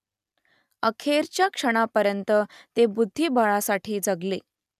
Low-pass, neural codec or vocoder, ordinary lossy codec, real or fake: 14.4 kHz; none; none; real